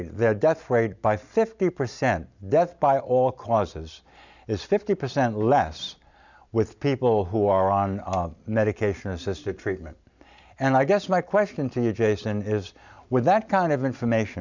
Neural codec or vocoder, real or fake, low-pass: none; real; 7.2 kHz